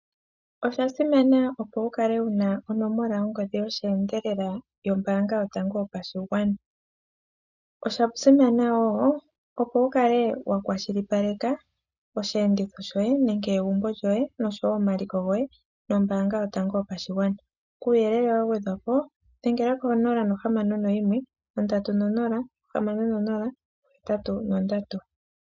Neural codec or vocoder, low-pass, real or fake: none; 7.2 kHz; real